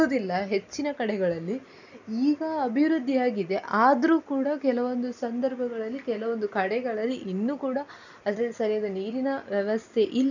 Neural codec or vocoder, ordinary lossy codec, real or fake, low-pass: none; none; real; 7.2 kHz